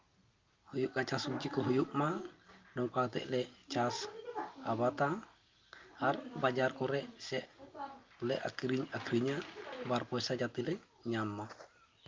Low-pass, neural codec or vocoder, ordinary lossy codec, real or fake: 7.2 kHz; none; Opus, 24 kbps; real